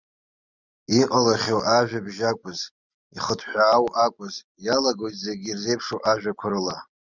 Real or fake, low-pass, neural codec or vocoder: real; 7.2 kHz; none